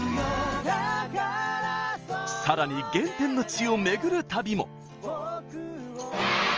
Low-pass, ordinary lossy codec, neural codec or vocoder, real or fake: 7.2 kHz; Opus, 24 kbps; none; real